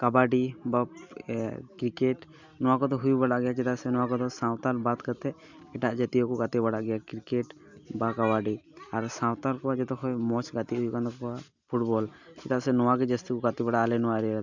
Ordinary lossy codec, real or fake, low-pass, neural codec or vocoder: none; real; 7.2 kHz; none